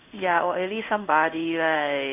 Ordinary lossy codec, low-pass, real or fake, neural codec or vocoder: none; 3.6 kHz; fake; codec, 16 kHz in and 24 kHz out, 1 kbps, XY-Tokenizer